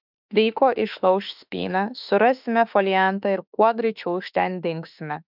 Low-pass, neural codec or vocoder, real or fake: 5.4 kHz; autoencoder, 48 kHz, 32 numbers a frame, DAC-VAE, trained on Japanese speech; fake